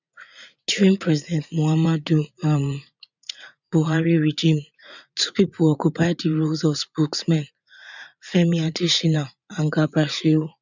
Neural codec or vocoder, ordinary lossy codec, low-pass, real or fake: vocoder, 44.1 kHz, 80 mel bands, Vocos; none; 7.2 kHz; fake